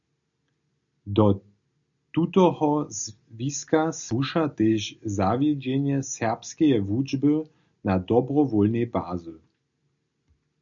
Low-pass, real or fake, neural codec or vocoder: 7.2 kHz; real; none